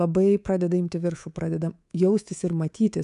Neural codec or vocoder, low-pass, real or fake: codec, 24 kHz, 3.1 kbps, DualCodec; 10.8 kHz; fake